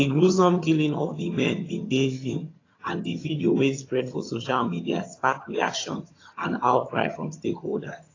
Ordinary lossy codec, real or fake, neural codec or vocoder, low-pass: AAC, 32 kbps; fake; vocoder, 22.05 kHz, 80 mel bands, HiFi-GAN; 7.2 kHz